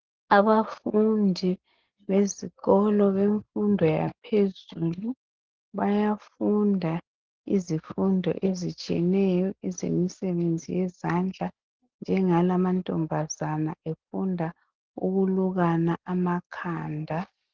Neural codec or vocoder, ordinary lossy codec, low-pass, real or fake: none; Opus, 16 kbps; 7.2 kHz; real